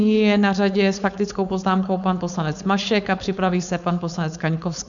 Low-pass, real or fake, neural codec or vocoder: 7.2 kHz; fake; codec, 16 kHz, 4.8 kbps, FACodec